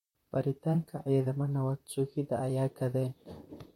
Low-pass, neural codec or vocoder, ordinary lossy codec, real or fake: 19.8 kHz; vocoder, 44.1 kHz, 128 mel bands, Pupu-Vocoder; MP3, 64 kbps; fake